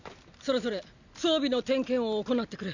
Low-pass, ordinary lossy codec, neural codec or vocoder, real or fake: 7.2 kHz; none; none; real